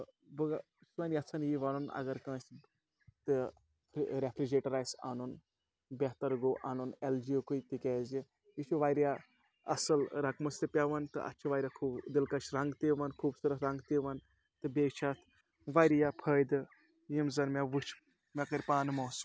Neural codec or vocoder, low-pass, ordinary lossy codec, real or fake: none; none; none; real